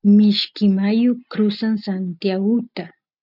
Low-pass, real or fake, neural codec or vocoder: 5.4 kHz; real; none